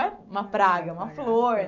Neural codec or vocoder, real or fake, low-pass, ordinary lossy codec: none; real; 7.2 kHz; none